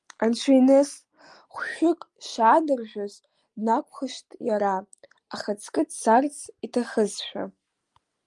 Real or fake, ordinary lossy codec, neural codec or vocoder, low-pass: real; Opus, 32 kbps; none; 10.8 kHz